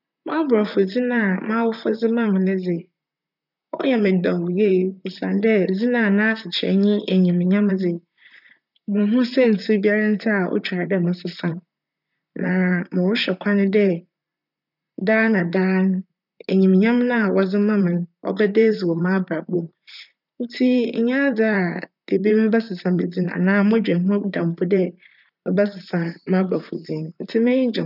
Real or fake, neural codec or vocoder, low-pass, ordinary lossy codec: fake; vocoder, 44.1 kHz, 128 mel bands, Pupu-Vocoder; 5.4 kHz; none